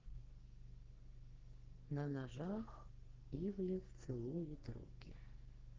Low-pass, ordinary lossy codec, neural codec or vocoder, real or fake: 7.2 kHz; Opus, 16 kbps; codec, 44.1 kHz, 2.6 kbps, SNAC; fake